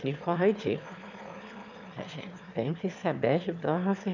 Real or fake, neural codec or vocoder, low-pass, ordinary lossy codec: fake; autoencoder, 22.05 kHz, a latent of 192 numbers a frame, VITS, trained on one speaker; 7.2 kHz; none